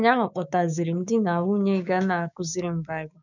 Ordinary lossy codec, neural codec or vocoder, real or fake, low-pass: none; codec, 16 kHz, 6 kbps, DAC; fake; 7.2 kHz